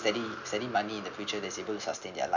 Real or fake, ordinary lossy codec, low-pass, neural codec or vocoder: real; none; 7.2 kHz; none